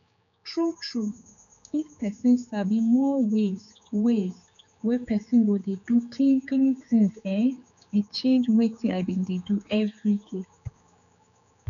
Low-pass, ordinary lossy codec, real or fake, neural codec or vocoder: 7.2 kHz; none; fake; codec, 16 kHz, 4 kbps, X-Codec, HuBERT features, trained on general audio